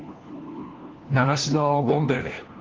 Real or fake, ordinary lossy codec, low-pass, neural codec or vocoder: fake; Opus, 16 kbps; 7.2 kHz; codec, 16 kHz, 1 kbps, FunCodec, trained on LibriTTS, 50 frames a second